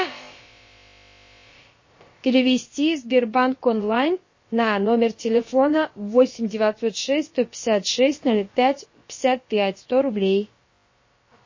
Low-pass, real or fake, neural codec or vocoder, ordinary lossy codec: 7.2 kHz; fake; codec, 16 kHz, about 1 kbps, DyCAST, with the encoder's durations; MP3, 32 kbps